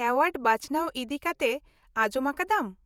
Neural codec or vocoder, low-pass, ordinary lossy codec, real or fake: vocoder, 48 kHz, 128 mel bands, Vocos; none; none; fake